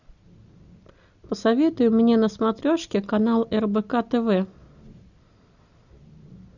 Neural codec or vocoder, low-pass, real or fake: none; 7.2 kHz; real